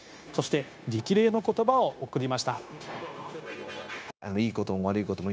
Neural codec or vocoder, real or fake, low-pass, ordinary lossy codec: codec, 16 kHz, 0.9 kbps, LongCat-Audio-Codec; fake; none; none